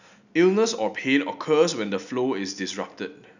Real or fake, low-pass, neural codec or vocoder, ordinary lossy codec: real; 7.2 kHz; none; none